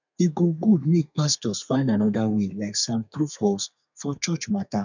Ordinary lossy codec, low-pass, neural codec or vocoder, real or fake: none; 7.2 kHz; codec, 32 kHz, 1.9 kbps, SNAC; fake